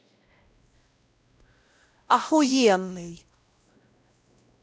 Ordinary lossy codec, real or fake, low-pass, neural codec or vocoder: none; fake; none; codec, 16 kHz, 0.5 kbps, X-Codec, WavLM features, trained on Multilingual LibriSpeech